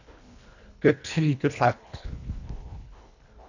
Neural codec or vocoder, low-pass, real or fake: codec, 24 kHz, 1.5 kbps, HILCodec; 7.2 kHz; fake